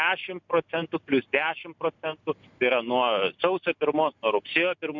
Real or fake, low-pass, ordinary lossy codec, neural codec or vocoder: real; 7.2 kHz; MP3, 64 kbps; none